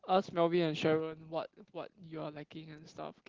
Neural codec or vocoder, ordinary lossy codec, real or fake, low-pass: none; Opus, 16 kbps; real; 7.2 kHz